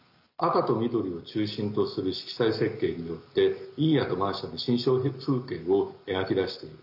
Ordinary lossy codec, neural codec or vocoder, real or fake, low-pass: none; none; real; 5.4 kHz